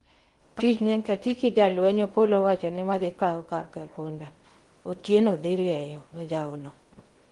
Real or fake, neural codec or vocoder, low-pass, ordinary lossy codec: fake; codec, 16 kHz in and 24 kHz out, 0.8 kbps, FocalCodec, streaming, 65536 codes; 10.8 kHz; Opus, 24 kbps